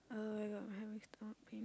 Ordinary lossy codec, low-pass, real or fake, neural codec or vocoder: none; none; real; none